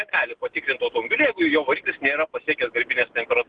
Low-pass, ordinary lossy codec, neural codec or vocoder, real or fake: 9.9 kHz; Opus, 24 kbps; none; real